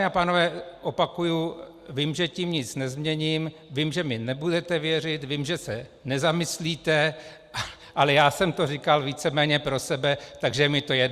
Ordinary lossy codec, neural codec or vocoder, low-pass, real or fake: Opus, 64 kbps; none; 14.4 kHz; real